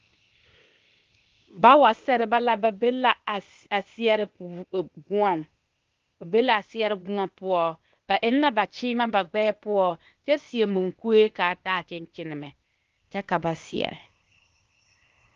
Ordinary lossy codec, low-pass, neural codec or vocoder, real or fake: Opus, 32 kbps; 7.2 kHz; codec, 16 kHz, 0.8 kbps, ZipCodec; fake